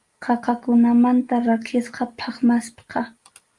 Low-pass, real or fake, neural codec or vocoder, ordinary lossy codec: 10.8 kHz; real; none; Opus, 32 kbps